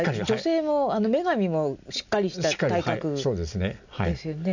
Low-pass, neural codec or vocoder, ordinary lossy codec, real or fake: 7.2 kHz; none; none; real